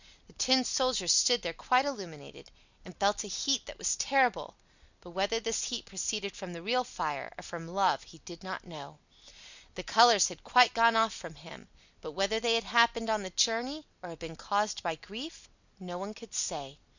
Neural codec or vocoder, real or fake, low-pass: none; real; 7.2 kHz